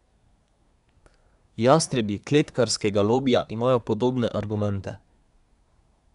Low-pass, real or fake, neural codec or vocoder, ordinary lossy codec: 10.8 kHz; fake; codec, 24 kHz, 1 kbps, SNAC; none